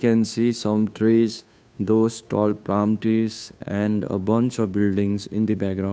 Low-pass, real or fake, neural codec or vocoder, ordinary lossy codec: none; fake; codec, 16 kHz, 2 kbps, FunCodec, trained on Chinese and English, 25 frames a second; none